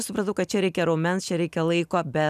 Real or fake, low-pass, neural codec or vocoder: real; 14.4 kHz; none